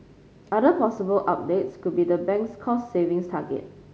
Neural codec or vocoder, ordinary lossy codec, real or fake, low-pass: none; none; real; none